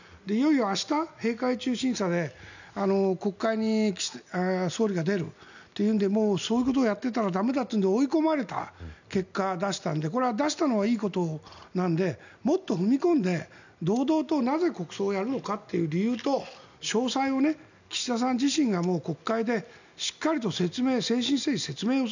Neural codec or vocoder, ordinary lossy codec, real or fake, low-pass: none; none; real; 7.2 kHz